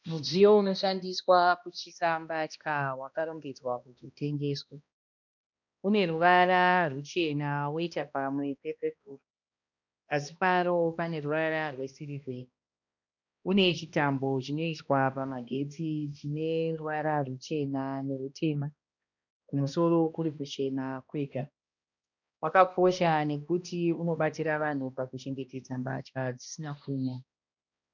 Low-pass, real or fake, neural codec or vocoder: 7.2 kHz; fake; codec, 16 kHz, 1 kbps, X-Codec, HuBERT features, trained on balanced general audio